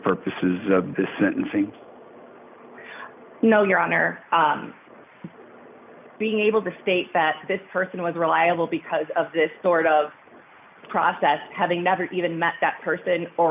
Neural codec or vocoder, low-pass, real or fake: none; 3.6 kHz; real